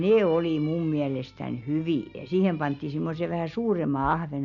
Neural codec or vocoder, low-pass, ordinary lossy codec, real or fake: none; 7.2 kHz; none; real